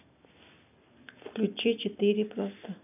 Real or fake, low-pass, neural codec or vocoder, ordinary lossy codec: real; 3.6 kHz; none; none